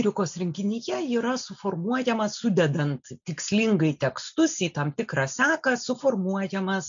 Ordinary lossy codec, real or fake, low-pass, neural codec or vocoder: MP3, 64 kbps; real; 7.2 kHz; none